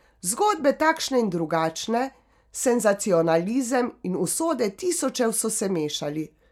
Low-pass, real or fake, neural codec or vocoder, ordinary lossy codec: 19.8 kHz; real; none; none